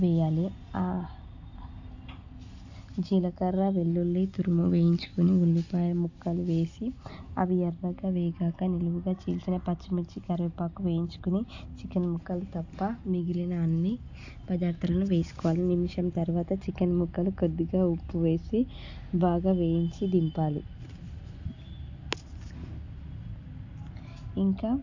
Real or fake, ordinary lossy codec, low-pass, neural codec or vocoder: real; AAC, 48 kbps; 7.2 kHz; none